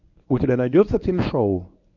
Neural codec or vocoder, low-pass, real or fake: codec, 24 kHz, 0.9 kbps, WavTokenizer, medium speech release version 1; 7.2 kHz; fake